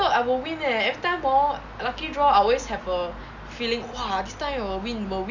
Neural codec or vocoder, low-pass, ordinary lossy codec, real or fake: none; 7.2 kHz; none; real